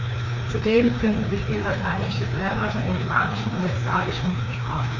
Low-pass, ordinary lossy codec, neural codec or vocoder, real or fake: 7.2 kHz; none; codec, 16 kHz, 2 kbps, FreqCodec, larger model; fake